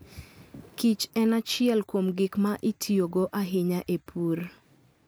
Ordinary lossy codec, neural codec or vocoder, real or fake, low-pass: none; none; real; none